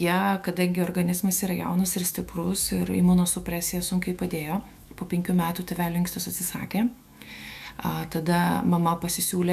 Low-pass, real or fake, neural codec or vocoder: 14.4 kHz; fake; autoencoder, 48 kHz, 128 numbers a frame, DAC-VAE, trained on Japanese speech